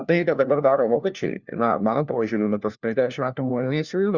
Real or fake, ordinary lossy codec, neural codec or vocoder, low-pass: fake; Opus, 64 kbps; codec, 16 kHz, 1 kbps, FunCodec, trained on LibriTTS, 50 frames a second; 7.2 kHz